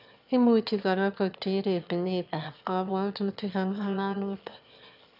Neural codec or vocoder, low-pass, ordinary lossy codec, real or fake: autoencoder, 22.05 kHz, a latent of 192 numbers a frame, VITS, trained on one speaker; 5.4 kHz; none; fake